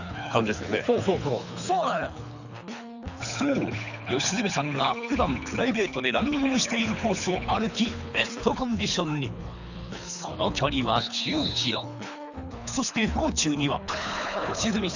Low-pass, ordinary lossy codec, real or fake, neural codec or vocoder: 7.2 kHz; none; fake; codec, 24 kHz, 3 kbps, HILCodec